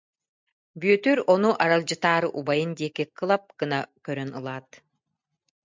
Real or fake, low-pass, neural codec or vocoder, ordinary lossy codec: real; 7.2 kHz; none; MP3, 64 kbps